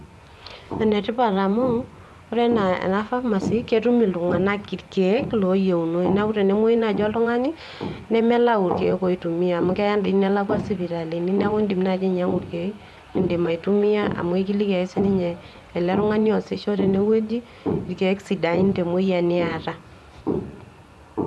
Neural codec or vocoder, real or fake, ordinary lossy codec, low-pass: vocoder, 24 kHz, 100 mel bands, Vocos; fake; none; none